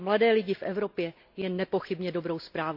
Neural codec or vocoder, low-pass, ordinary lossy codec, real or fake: none; 5.4 kHz; AAC, 48 kbps; real